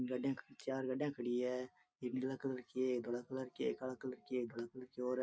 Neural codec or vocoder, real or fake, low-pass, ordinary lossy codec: none; real; none; none